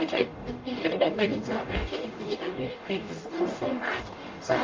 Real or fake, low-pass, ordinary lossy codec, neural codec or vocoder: fake; 7.2 kHz; Opus, 24 kbps; codec, 44.1 kHz, 0.9 kbps, DAC